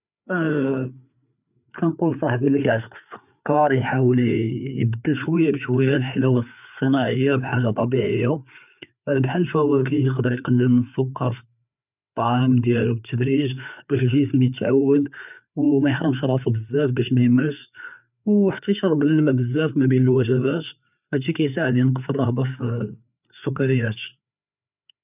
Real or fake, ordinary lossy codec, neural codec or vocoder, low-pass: fake; none; codec, 16 kHz, 4 kbps, FreqCodec, larger model; 3.6 kHz